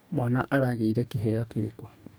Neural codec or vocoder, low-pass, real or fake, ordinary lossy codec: codec, 44.1 kHz, 2.6 kbps, DAC; none; fake; none